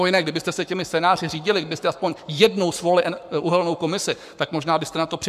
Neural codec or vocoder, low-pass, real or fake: codec, 44.1 kHz, 7.8 kbps, Pupu-Codec; 14.4 kHz; fake